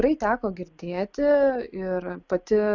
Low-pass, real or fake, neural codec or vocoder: 7.2 kHz; real; none